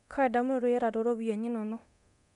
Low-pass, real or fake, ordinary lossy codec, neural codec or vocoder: 10.8 kHz; fake; MP3, 96 kbps; codec, 24 kHz, 0.9 kbps, DualCodec